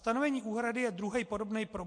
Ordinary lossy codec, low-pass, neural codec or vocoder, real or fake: MP3, 48 kbps; 9.9 kHz; none; real